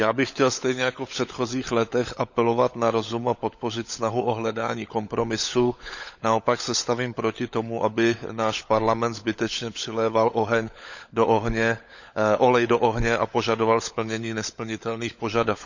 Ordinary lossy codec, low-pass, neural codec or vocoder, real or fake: none; 7.2 kHz; codec, 16 kHz, 16 kbps, FunCodec, trained on LibriTTS, 50 frames a second; fake